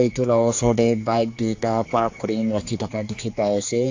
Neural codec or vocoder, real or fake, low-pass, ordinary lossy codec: codec, 16 kHz, 2 kbps, X-Codec, HuBERT features, trained on general audio; fake; 7.2 kHz; AAC, 48 kbps